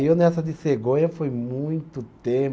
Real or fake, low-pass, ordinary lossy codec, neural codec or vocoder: real; none; none; none